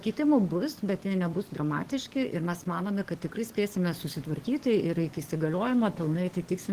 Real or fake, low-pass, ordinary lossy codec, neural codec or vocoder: fake; 14.4 kHz; Opus, 16 kbps; codec, 44.1 kHz, 7.8 kbps, DAC